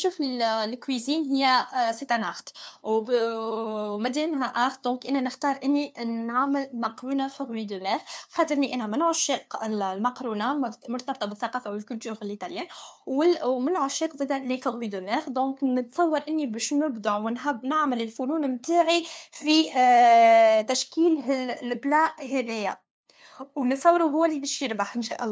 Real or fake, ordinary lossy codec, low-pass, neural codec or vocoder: fake; none; none; codec, 16 kHz, 2 kbps, FunCodec, trained on LibriTTS, 25 frames a second